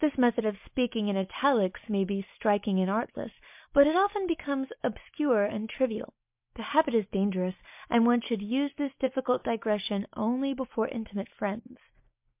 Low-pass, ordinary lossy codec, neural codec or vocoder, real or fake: 3.6 kHz; MP3, 32 kbps; none; real